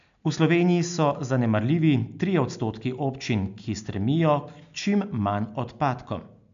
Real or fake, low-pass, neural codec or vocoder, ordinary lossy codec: real; 7.2 kHz; none; MP3, 64 kbps